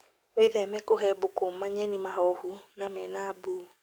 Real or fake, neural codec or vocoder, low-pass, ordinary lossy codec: fake; codec, 44.1 kHz, 7.8 kbps, DAC; none; none